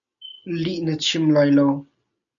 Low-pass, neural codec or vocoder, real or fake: 7.2 kHz; none; real